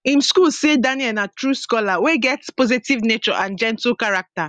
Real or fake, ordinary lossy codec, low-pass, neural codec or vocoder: real; Opus, 64 kbps; 9.9 kHz; none